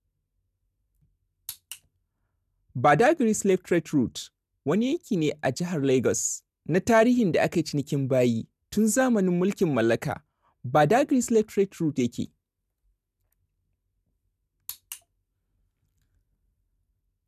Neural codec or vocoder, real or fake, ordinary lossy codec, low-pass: none; real; none; 14.4 kHz